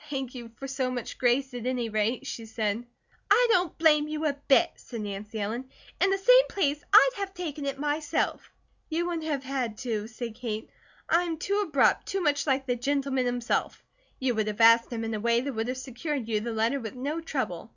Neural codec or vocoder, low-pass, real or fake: none; 7.2 kHz; real